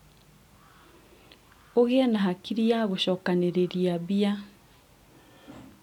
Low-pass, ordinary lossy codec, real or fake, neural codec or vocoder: 19.8 kHz; none; real; none